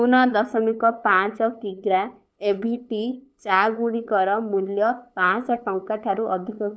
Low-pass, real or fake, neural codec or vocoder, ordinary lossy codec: none; fake; codec, 16 kHz, 8 kbps, FunCodec, trained on LibriTTS, 25 frames a second; none